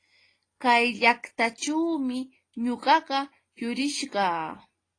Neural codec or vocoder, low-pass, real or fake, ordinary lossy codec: none; 9.9 kHz; real; AAC, 32 kbps